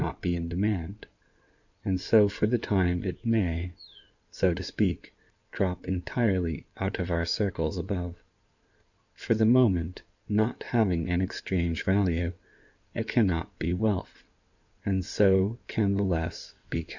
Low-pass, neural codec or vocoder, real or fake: 7.2 kHz; codec, 16 kHz in and 24 kHz out, 2.2 kbps, FireRedTTS-2 codec; fake